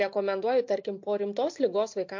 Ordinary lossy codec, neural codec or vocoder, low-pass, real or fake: MP3, 48 kbps; none; 7.2 kHz; real